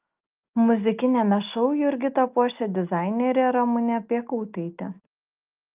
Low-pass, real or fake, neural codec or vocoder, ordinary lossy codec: 3.6 kHz; real; none; Opus, 24 kbps